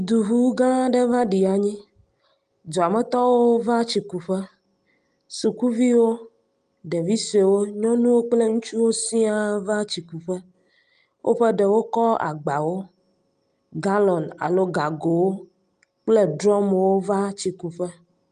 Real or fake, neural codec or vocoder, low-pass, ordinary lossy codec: real; none; 9.9 kHz; Opus, 32 kbps